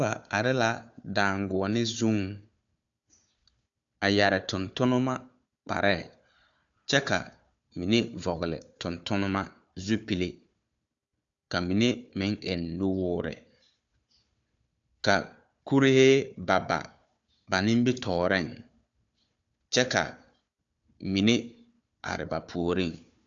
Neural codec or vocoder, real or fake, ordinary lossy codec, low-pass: codec, 16 kHz, 4 kbps, FunCodec, trained on Chinese and English, 50 frames a second; fake; AAC, 64 kbps; 7.2 kHz